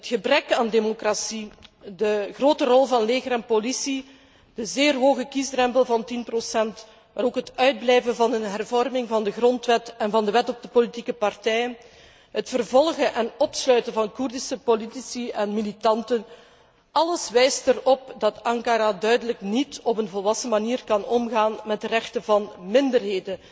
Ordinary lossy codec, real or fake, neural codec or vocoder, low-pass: none; real; none; none